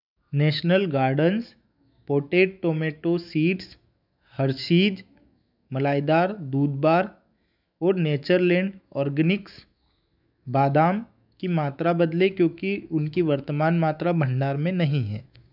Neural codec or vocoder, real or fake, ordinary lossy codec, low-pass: autoencoder, 48 kHz, 128 numbers a frame, DAC-VAE, trained on Japanese speech; fake; none; 5.4 kHz